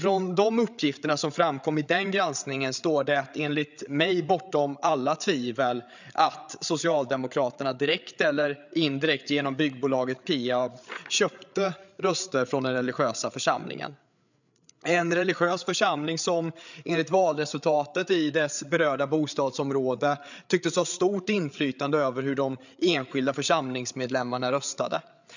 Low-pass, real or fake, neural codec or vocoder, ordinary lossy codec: 7.2 kHz; fake; codec, 16 kHz, 8 kbps, FreqCodec, larger model; none